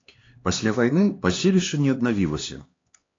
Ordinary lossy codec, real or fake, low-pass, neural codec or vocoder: AAC, 32 kbps; fake; 7.2 kHz; codec, 16 kHz, 2 kbps, X-Codec, HuBERT features, trained on LibriSpeech